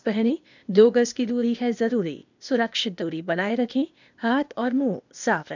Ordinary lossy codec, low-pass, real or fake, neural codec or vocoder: none; 7.2 kHz; fake; codec, 16 kHz, 0.8 kbps, ZipCodec